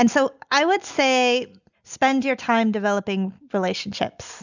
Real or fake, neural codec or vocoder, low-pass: real; none; 7.2 kHz